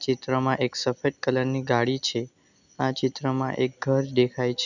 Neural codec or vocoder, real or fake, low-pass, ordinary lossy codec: none; real; 7.2 kHz; none